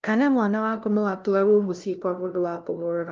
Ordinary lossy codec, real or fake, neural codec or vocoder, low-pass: Opus, 24 kbps; fake; codec, 16 kHz, 0.5 kbps, FunCodec, trained on LibriTTS, 25 frames a second; 7.2 kHz